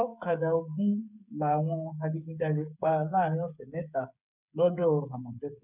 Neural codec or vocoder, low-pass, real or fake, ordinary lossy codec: codec, 16 kHz, 8 kbps, FreqCodec, smaller model; 3.6 kHz; fake; none